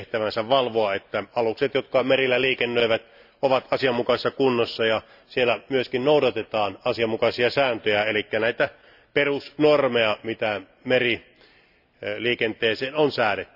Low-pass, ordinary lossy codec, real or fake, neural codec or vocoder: 5.4 kHz; none; real; none